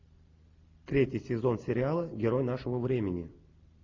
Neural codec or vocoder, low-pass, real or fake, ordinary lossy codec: none; 7.2 kHz; real; AAC, 48 kbps